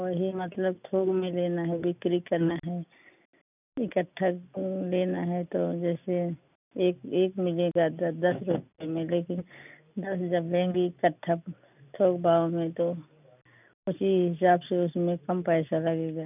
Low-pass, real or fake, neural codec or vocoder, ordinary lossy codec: 3.6 kHz; real; none; none